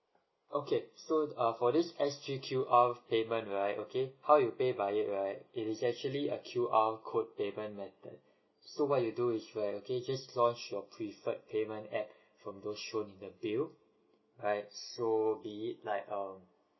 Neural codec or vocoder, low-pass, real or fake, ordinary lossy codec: none; 7.2 kHz; real; MP3, 24 kbps